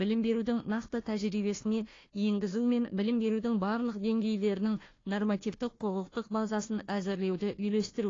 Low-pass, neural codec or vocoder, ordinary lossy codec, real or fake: 7.2 kHz; codec, 16 kHz, 1 kbps, FunCodec, trained on Chinese and English, 50 frames a second; AAC, 32 kbps; fake